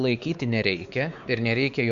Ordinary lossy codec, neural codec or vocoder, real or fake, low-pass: Opus, 64 kbps; codec, 16 kHz, 4 kbps, X-Codec, WavLM features, trained on Multilingual LibriSpeech; fake; 7.2 kHz